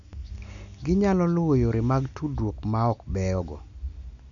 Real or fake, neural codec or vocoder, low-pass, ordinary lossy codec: real; none; 7.2 kHz; none